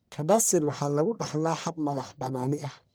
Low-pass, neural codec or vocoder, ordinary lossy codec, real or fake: none; codec, 44.1 kHz, 1.7 kbps, Pupu-Codec; none; fake